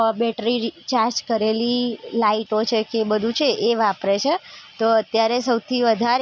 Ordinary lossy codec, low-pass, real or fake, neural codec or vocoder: none; none; real; none